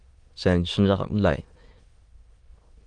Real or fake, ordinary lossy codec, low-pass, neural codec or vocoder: fake; Opus, 24 kbps; 9.9 kHz; autoencoder, 22.05 kHz, a latent of 192 numbers a frame, VITS, trained on many speakers